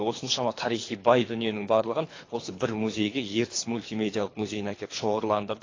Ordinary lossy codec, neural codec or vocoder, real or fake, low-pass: AAC, 32 kbps; codec, 24 kHz, 3 kbps, HILCodec; fake; 7.2 kHz